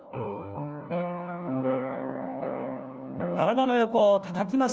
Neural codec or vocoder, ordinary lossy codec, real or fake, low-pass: codec, 16 kHz, 1 kbps, FunCodec, trained on LibriTTS, 50 frames a second; none; fake; none